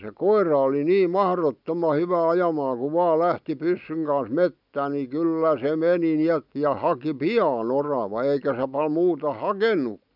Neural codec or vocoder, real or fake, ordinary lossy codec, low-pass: none; real; none; 5.4 kHz